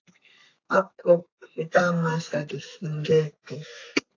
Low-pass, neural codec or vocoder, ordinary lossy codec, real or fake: 7.2 kHz; codec, 32 kHz, 1.9 kbps, SNAC; AAC, 48 kbps; fake